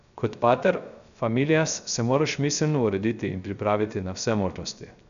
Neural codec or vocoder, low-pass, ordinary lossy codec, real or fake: codec, 16 kHz, 0.3 kbps, FocalCodec; 7.2 kHz; none; fake